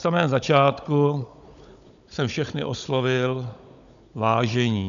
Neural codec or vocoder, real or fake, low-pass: codec, 16 kHz, 16 kbps, FunCodec, trained on Chinese and English, 50 frames a second; fake; 7.2 kHz